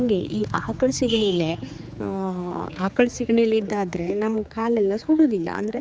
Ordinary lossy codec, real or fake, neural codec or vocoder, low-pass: none; fake; codec, 16 kHz, 4 kbps, X-Codec, HuBERT features, trained on general audio; none